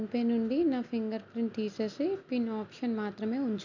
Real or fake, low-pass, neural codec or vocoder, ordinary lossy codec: real; 7.2 kHz; none; none